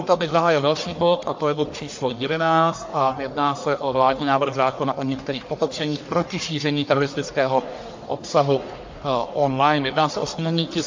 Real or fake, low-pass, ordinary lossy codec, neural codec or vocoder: fake; 7.2 kHz; MP3, 48 kbps; codec, 44.1 kHz, 1.7 kbps, Pupu-Codec